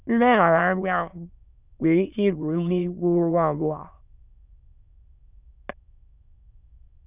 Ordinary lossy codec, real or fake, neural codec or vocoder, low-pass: none; fake; autoencoder, 22.05 kHz, a latent of 192 numbers a frame, VITS, trained on many speakers; 3.6 kHz